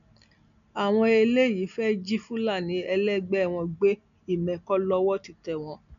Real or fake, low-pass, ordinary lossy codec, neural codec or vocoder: real; 7.2 kHz; MP3, 96 kbps; none